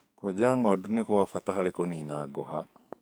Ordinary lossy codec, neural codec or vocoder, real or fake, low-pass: none; codec, 44.1 kHz, 2.6 kbps, SNAC; fake; none